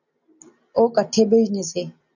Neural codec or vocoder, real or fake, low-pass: none; real; 7.2 kHz